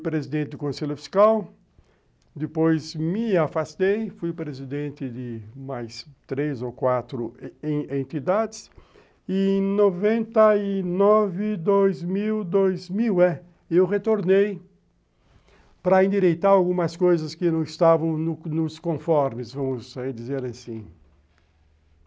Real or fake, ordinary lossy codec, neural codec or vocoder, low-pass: real; none; none; none